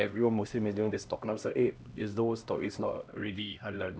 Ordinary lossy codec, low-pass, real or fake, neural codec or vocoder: none; none; fake; codec, 16 kHz, 1 kbps, X-Codec, HuBERT features, trained on LibriSpeech